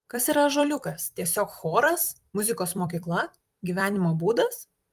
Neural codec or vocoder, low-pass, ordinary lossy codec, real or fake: vocoder, 44.1 kHz, 128 mel bands every 256 samples, BigVGAN v2; 14.4 kHz; Opus, 32 kbps; fake